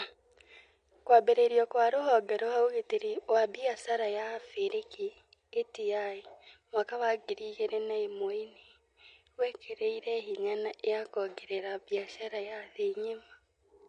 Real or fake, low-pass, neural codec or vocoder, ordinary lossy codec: real; 9.9 kHz; none; MP3, 48 kbps